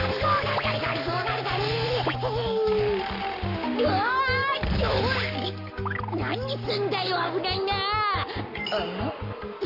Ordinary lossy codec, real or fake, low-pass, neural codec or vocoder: none; fake; 5.4 kHz; codec, 44.1 kHz, 7.8 kbps, Pupu-Codec